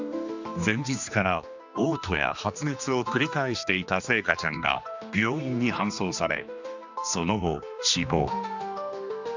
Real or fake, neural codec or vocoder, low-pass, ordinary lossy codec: fake; codec, 16 kHz, 2 kbps, X-Codec, HuBERT features, trained on general audio; 7.2 kHz; none